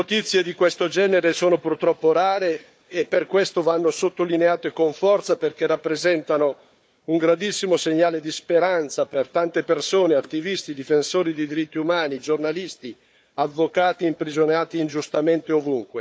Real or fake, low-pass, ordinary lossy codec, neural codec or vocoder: fake; none; none; codec, 16 kHz, 4 kbps, FunCodec, trained on Chinese and English, 50 frames a second